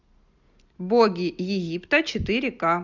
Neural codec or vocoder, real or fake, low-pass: none; real; 7.2 kHz